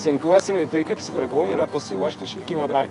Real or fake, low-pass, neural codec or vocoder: fake; 10.8 kHz; codec, 24 kHz, 0.9 kbps, WavTokenizer, medium music audio release